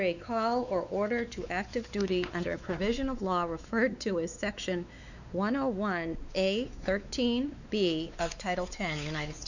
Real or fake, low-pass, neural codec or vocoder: fake; 7.2 kHz; codec, 16 kHz, 4 kbps, X-Codec, WavLM features, trained on Multilingual LibriSpeech